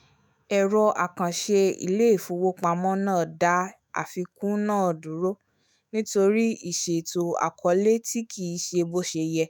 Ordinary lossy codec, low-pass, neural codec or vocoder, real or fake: none; none; autoencoder, 48 kHz, 128 numbers a frame, DAC-VAE, trained on Japanese speech; fake